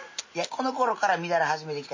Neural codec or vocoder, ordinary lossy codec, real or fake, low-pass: none; MP3, 32 kbps; real; 7.2 kHz